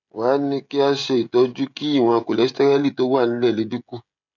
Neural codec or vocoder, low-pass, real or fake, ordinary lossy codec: codec, 16 kHz, 16 kbps, FreqCodec, smaller model; 7.2 kHz; fake; none